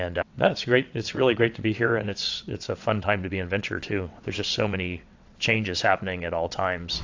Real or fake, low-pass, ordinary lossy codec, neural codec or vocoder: fake; 7.2 kHz; AAC, 48 kbps; vocoder, 22.05 kHz, 80 mel bands, WaveNeXt